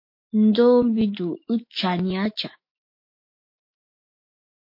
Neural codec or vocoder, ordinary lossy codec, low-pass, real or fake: autoencoder, 48 kHz, 128 numbers a frame, DAC-VAE, trained on Japanese speech; MP3, 32 kbps; 5.4 kHz; fake